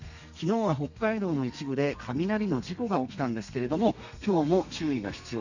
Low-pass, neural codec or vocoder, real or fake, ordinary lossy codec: 7.2 kHz; codec, 32 kHz, 1.9 kbps, SNAC; fake; none